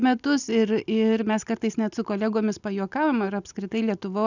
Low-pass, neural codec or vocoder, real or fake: 7.2 kHz; none; real